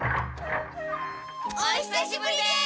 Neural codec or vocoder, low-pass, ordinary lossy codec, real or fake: none; none; none; real